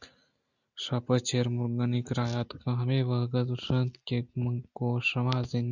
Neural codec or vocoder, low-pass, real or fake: none; 7.2 kHz; real